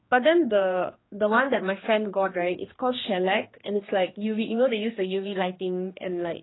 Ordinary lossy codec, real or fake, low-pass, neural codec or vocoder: AAC, 16 kbps; fake; 7.2 kHz; codec, 16 kHz, 2 kbps, X-Codec, HuBERT features, trained on general audio